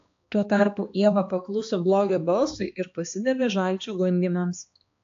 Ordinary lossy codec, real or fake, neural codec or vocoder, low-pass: AAC, 48 kbps; fake; codec, 16 kHz, 2 kbps, X-Codec, HuBERT features, trained on balanced general audio; 7.2 kHz